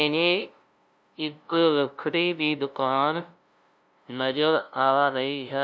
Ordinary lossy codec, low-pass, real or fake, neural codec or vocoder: none; none; fake; codec, 16 kHz, 0.5 kbps, FunCodec, trained on LibriTTS, 25 frames a second